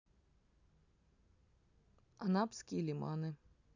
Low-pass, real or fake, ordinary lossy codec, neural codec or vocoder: 7.2 kHz; real; none; none